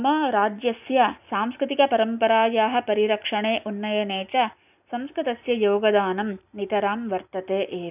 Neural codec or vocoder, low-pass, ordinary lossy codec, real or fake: none; 3.6 kHz; none; real